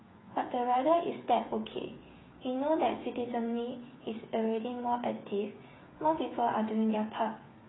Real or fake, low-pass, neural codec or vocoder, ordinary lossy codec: fake; 7.2 kHz; codec, 16 kHz, 8 kbps, FreqCodec, smaller model; AAC, 16 kbps